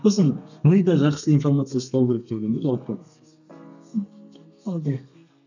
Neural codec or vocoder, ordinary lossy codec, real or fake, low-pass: codec, 32 kHz, 1.9 kbps, SNAC; none; fake; 7.2 kHz